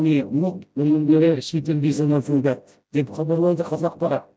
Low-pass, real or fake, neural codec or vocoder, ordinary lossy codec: none; fake; codec, 16 kHz, 0.5 kbps, FreqCodec, smaller model; none